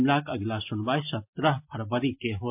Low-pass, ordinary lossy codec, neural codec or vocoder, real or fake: 3.6 kHz; MP3, 32 kbps; none; real